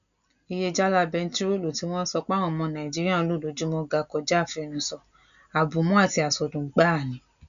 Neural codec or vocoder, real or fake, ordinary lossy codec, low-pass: none; real; none; 7.2 kHz